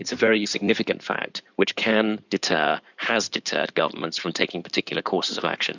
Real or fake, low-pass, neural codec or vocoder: fake; 7.2 kHz; codec, 16 kHz in and 24 kHz out, 2.2 kbps, FireRedTTS-2 codec